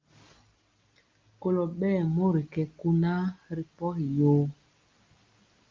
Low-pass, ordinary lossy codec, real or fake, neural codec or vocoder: 7.2 kHz; Opus, 24 kbps; real; none